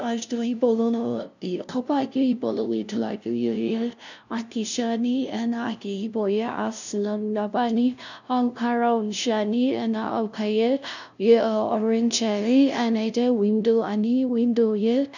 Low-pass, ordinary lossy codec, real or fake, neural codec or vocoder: 7.2 kHz; none; fake; codec, 16 kHz, 0.5 kbps, FunCodec, trained on LibriTTS, 25 frames a second